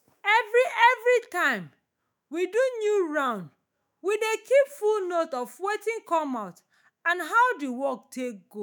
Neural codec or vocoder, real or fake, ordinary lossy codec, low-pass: autoencoder, 48 kHz, 128 numbers a frame, DAC-VAE, trained on Japanese speech; fake; none; none